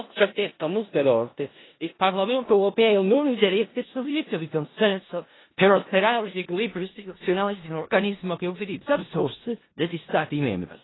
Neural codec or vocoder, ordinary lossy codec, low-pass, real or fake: codec, 16 kHz in and 24 kHz out, 0.4 kbps, LongCat-Audio-Codec, four codebook decoder; AAC, 16 kbps; 7.2 kHz; fake